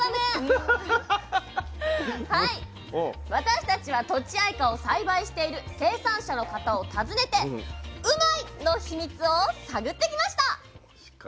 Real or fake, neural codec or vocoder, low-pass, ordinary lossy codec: real; none; none; none